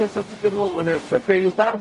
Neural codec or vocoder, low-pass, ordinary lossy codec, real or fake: codec, 44.1 kHz, 0.9 kbps, DAC; 14.4 kHz; MP3, 48 kbps; fake